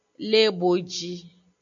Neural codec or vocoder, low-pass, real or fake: none; 7.2 kHz; real